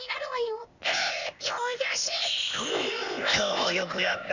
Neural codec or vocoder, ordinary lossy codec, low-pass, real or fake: codec, 16 kHz, 0.8 kbps, ZipCodec; none; 7.2 kHz; fake